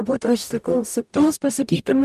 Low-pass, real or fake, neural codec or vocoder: 14.4 kHz; fake; codec, 44.1 kHz, 0.9 kbps, DAC